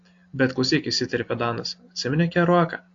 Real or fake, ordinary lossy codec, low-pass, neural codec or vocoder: real; AAC, 48 kbps; 7.2 kHz; none